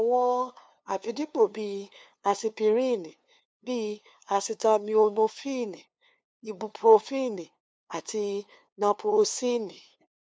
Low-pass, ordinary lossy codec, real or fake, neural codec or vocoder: none; none; fake; codec, 16 kHz, 2 kbps, FunCodec, trained on LibriTTS, 25 frames a second